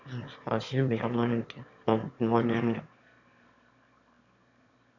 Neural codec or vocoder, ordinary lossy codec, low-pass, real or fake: autoencoder, 22.05 kHz, a latent of 192 numbers a frame, VITS, trained on one speaker; none; 7.2 kHz; fake